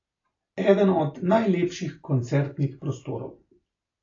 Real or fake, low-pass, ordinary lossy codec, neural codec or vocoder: real; 7.2 kHz; AAC, 32 kbps; none